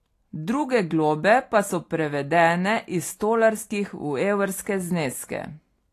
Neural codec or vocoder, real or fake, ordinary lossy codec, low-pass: none; real; AAC, 48 kbps; 14.4 kHz